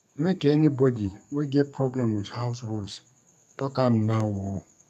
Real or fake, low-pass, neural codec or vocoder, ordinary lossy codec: fake; 14.4 kHz; codec, 32 kHz, 1.9 kbps, SNAC; none